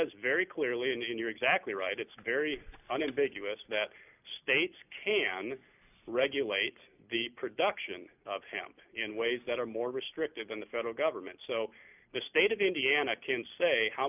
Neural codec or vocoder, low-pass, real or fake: none; 3.6 kHz; real